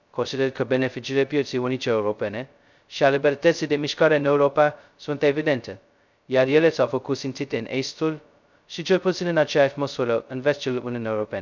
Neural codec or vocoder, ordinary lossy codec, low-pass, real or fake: codec, 16 kHz, 0.2 kbps, FocalCodec; none; 7.2 kHz; fake